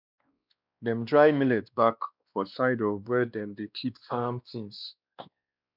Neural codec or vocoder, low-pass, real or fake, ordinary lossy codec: codec, 16 kHz, 2 kbps, X-Codec, WavLM features, trained on Multilingual LibriSpeech; 5.4 kHz; fake; none